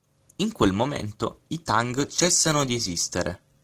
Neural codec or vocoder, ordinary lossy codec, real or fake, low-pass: none; Opus, 16 kbps; real; 14.4 kHz